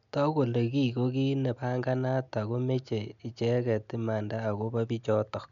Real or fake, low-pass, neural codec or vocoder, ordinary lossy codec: real; 7.2 kHz; none; none